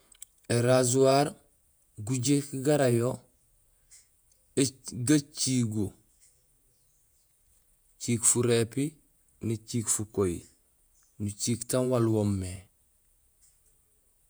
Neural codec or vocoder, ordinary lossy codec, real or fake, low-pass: vocoder, 48 kHz, 128 mel bands, Vocos; none; fake; none